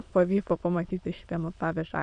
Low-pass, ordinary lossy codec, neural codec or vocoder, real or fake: 9.9 kHz; AAC, 64 kbps; autoencoder, 22.05 kHz, a latent of 192 numbers a frame, VITS, trained on many speakers; fake